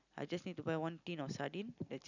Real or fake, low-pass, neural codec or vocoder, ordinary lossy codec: real; 7.2 kHz; none; none